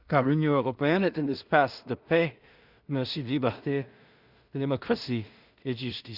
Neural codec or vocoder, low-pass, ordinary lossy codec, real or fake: codec, 16 kHz in and 24 kHz out, 0.4 kbps, LongCat-Audio-Codec, two codebook decoder; 5.4 kHz; Opus, 64 kbps; fake